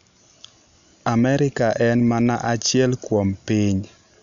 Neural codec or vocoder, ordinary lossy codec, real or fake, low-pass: none; none; real; 7.2 kHz